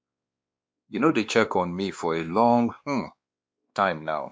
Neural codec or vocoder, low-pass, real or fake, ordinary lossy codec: codec, 16 kHz, 2 kbps, X-Codec, WavLM features, trained on Multilingual LibriSpeech; none; fake; none